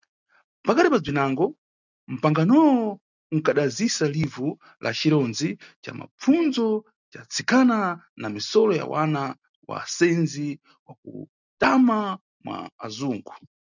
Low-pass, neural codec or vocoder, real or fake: 7.2 kHz; none; real